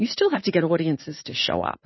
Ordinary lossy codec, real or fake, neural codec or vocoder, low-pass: MP3, 24 kbps; fake; vocoder, 44.1 kHz, 80 mel bands, Vocos; 7.2 kHz